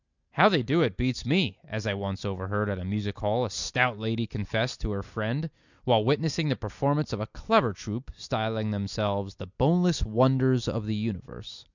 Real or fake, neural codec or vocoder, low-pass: real; none; 7.2 kHz